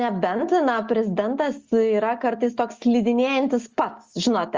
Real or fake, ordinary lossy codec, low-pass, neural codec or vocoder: real; Opus, 32 kbps; 7.2 kHz; none